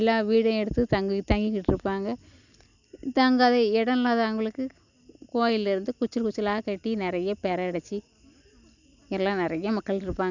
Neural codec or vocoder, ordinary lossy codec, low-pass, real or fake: none; none; 7.2 kHz; real